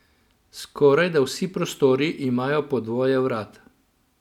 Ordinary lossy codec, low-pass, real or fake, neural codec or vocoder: none; 19.8 kHz; real; none